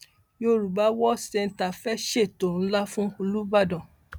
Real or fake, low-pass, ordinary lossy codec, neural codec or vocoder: real; none; none; none